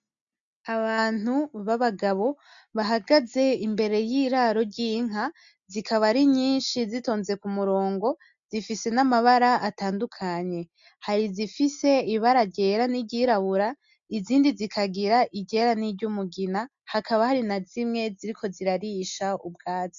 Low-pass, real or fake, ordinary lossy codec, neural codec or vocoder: 7.2 kHz; real; MP3, 64 kbps; none